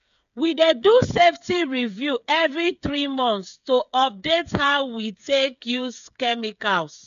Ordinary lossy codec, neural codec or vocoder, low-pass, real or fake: none; codec, 16 kHz, 8 kbps, FreqCodec, smaller model; 7.2 kHz; fake